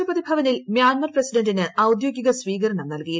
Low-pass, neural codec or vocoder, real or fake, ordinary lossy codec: none; none; real; none